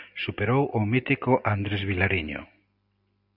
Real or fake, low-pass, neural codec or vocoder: real; 5.4 kHz; none